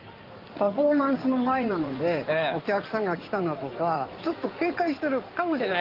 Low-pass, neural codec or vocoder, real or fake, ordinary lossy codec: 5.4 kHz; codec, 16 kHz in and 24 kHz out, 2.2 kbps, FireRedTTS-2 codec; fake; Opus, 32 kbps